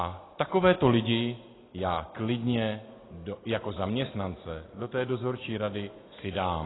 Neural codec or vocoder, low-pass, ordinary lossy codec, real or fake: none; 7.2 kHz; AAC, 16 kbps; real